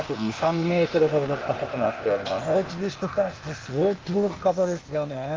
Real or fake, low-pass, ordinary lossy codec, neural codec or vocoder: fake; 7.2 kHz; Opus, 32 kbps; codec, 16 kHz, 0.8 kbps, ZipCodec